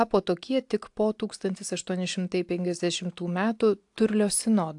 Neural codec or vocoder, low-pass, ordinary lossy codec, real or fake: none; 10.8 kHz; AAC, 64 kbps; real